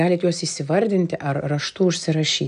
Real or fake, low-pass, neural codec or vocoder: real; 9.9 kHz; none